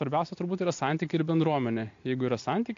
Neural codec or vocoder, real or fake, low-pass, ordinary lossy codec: none; real; 7.2 kHz; AAC, 48 kbps